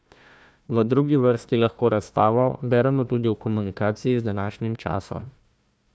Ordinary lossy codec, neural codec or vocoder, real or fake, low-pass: none; codec, 16 kHz, 1 kbps, FunCodec, trained on Chinese and English, 50 frames a second; fake; none